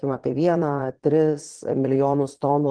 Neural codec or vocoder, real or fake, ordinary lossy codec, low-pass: vocoder, 22.05 kHz, 80 mel bands, Vocos; fake; Opus, 16 kbps; 9.9 kHz